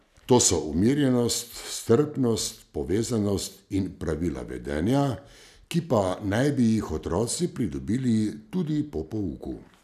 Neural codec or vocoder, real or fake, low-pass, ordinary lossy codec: none; real; 14.4 kHz; none